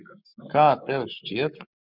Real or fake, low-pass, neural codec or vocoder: fake; 5.4 kHz; codec, 44.1 kHz, 7.8 kbps, Pupu-Codec